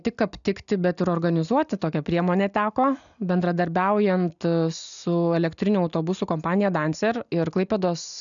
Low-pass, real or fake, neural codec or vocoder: 7.2 kHz; real; none